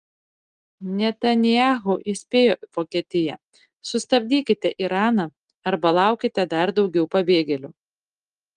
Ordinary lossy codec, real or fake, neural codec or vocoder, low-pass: Opus, 32 kbps; real; none; 9.9 kHz